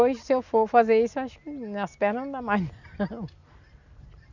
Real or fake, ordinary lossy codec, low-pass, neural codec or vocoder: real; none; 7.2 kHz; none